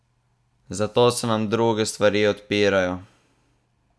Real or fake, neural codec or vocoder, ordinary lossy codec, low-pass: real; none; none; none